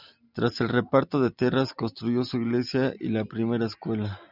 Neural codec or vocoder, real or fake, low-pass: none; real; 5.4 kHz